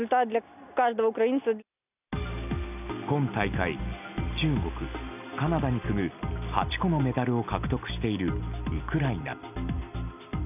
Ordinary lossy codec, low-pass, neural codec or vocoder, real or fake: none; 3.6 kHz; none; real